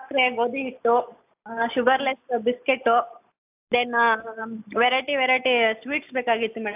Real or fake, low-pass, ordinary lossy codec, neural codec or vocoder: real; 3.6 kHz; AAC, 32 kbps; none